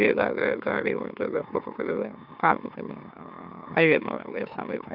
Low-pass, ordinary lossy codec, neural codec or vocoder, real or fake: 5.4 kHz; none; autoencoder, 44.1 kHz, a latent of 192 numbers a frame, MeloTTS; fake